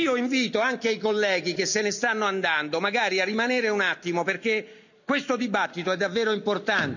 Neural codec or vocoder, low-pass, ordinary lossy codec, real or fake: none; 7.2 kHz; none; real